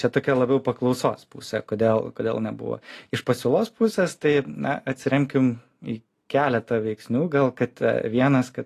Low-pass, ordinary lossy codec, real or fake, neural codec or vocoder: 14.4 kHz; AAC, 48 kbps; real; none